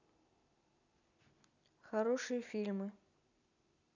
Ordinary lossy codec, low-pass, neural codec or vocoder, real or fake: none; 7.2 kHz; none; real